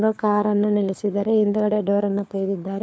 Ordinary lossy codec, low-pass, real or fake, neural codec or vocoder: none; none; fake; codec, 16 kHz, 4 kbps, FunCodec, trained on LibriTTS, 50 frames a second